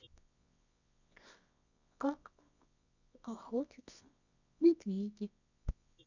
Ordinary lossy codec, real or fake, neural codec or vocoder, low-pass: none; fake; codec, 24 kHz, 0.9 kbps, WavTokenizer, medium music audio release; 7.2 kHz